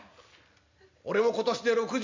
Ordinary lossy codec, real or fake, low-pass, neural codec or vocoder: MP3, 64 kbps; real; 7.2 kHz; none